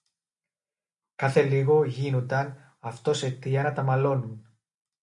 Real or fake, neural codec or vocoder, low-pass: real; none; 10.8 kHz